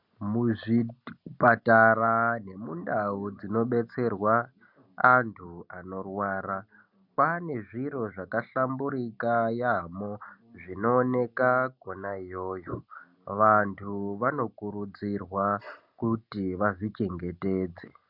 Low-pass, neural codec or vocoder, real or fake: 5.4 kHz; none; real